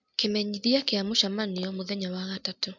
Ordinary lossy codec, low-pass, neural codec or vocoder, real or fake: MP3, 64 kbps; 7.2 kHz; none; real